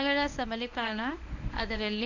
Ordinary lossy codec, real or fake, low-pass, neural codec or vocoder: none; fake; 7.2 kHz; codec, 24 kHz, 0.9 kbps, WavTokenizer, medium speech release version 1